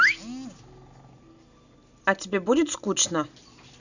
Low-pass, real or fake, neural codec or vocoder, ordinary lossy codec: 7.2 kHz; real; none; none